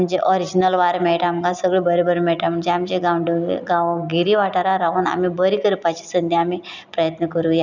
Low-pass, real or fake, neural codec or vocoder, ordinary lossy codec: 7.2 kHz; real; none; none